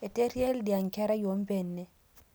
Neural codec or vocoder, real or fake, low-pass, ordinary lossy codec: none; real; none; none